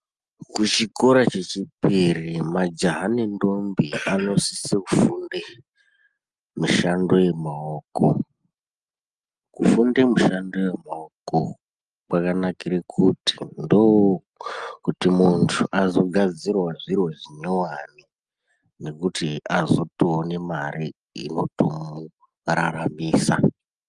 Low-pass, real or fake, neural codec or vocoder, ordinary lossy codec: 10.8 kHz; real; none; Opus, 32 kbps